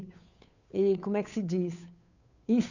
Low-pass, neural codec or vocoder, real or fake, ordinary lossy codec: 7.2 kHz; codec, 16 kHz, 2 kbps, FunCodec, trained on Chinese and English, 25 frames a second; fake; none